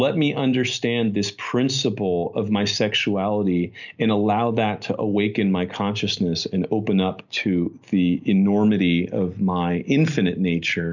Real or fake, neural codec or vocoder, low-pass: real; none; 7.2 kHz